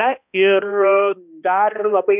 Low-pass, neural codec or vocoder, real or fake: 3.6 kHz; codec, 16 kHz, 1 kbps, X-Codec, HuBERT features, trained on balanced general audio; fake